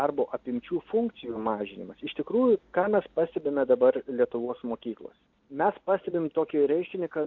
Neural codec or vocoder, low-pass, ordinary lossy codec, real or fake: none; 7.2 kHz; AAC, 48 kbps; real